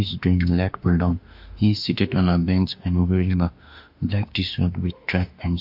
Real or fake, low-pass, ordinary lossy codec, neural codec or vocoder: fake; 5.4 kHz; MP3, 48 kbps; autoencoder, 48 kHz, 32 numbers a frame, DAC-VAE, trained on Japanese speech